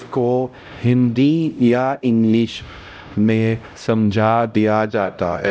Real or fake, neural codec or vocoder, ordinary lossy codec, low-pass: fake; codec, 16 kHz, 0.5 kbps, X-Codec, HuBERT features, trained on LibriSpeech; none; none